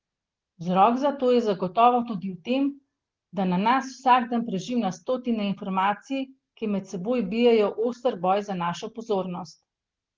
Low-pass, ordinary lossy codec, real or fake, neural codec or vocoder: 7.2 kHz; Opus, 16 kbps; real; none